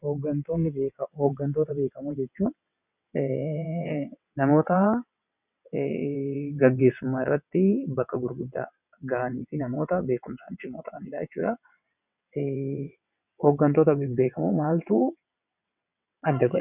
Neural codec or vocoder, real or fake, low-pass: vocoder, 22.05 kHz, 80 mel bands, WaveNeXt; fake; 3.6 kHz